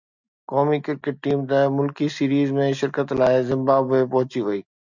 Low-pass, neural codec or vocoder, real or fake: 7.2 kHz; none; real